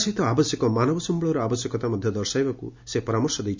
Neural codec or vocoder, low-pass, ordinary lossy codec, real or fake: none; 7.2 kHz; MP3, 64 kbps; real